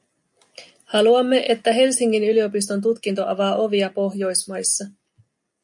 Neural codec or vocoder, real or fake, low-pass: none; real; 10.8 kHz